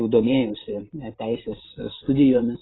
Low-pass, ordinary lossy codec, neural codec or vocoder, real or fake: 7.2 kHz; AAC, 16 kbps; vocoder, 44.1 kHz, 128 mel bands every 512 samples, BigVGAN v2; fake